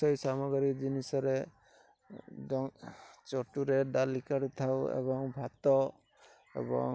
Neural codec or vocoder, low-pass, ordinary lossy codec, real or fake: none; none; none; real